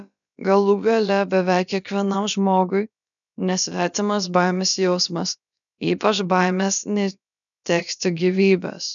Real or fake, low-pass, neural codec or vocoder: fake; 7.2 kHz; codec, 16 kHz, about 1 kbps, DyCAST, with the encoder's durations